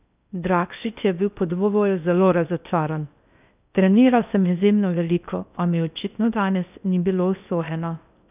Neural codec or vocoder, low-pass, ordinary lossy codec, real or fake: codec, 16 kHz in and 24 kHz out, 0.6 kbps, FocalCodec, streaming, 2048 codes; 3.6 kHz; none; fake